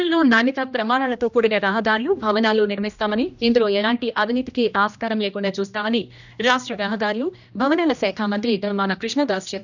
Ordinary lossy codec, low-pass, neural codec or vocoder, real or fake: none; 7.2 kHz; codec, 16 kHz, 1 kbps, X-Codec, HuBERT features, trained on general audio; fake